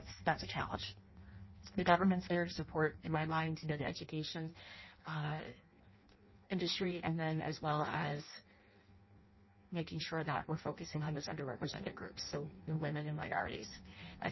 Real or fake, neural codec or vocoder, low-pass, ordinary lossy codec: fake; codec, 16 kHz in and 24 kHz out, 0.6 kbps, FireRedTTS-2 codec; 7.2 kHz; MP3, 24 kbps